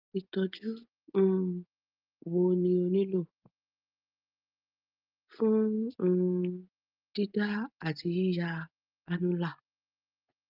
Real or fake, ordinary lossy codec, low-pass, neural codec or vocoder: real; Opus, 24 kbps; 5.4 kHz; none